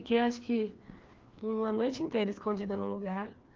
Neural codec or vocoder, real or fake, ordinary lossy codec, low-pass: codec, 16 kHz, 2 kbps, FreqCodec, larger model; fake; Opus, 32 kbps; 7.2 kHz